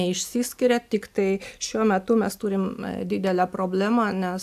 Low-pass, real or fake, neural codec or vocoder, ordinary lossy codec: 14.4 kHz; real; none; AAC, 96 kbps